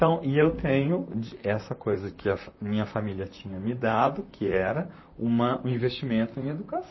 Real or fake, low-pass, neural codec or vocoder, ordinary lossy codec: fake; 7.2 kHz; vocoder, 44.1 kHz, 128 mel bands, Pupu-Vocoder; MP3, 24 kbps